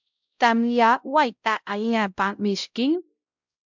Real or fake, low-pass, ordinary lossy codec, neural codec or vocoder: fake; 7.2 kHz; MP3, 64 kbps; codec, 16 kHz, 0.5 kbps, X-Codec, WavLM features, trained on Multilingual LibriSpeech